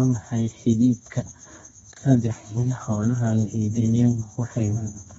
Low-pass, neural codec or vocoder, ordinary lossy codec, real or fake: 10.8 kHz; codec, 24 kHz, 0.9 kbps, WavTokenizer, medium music audio release; AAC, 24 kbps; fake